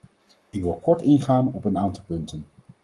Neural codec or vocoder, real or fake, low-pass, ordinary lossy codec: codec, 44.1 kHz, 7.8 kbps, Pupu-Codec; fake; 10.8 kHz; Opus, 32 kbps